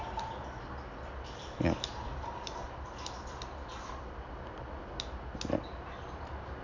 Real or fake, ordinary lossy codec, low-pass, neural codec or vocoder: real; none; 7.2 kHz; none